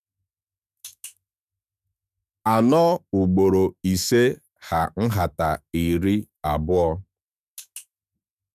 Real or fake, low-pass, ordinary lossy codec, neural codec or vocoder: fake; 14.4 kHz; none; autoencoder, 48 kHz, 128 numbers a frame, DAC-VAE, trained on Japanese speech